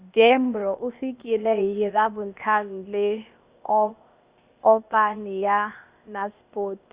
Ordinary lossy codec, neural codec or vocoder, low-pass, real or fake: Opus, 64 kbps; codec, 16 kHz, 0.8 kbps, ZipCodec; 3.6 kHz; fake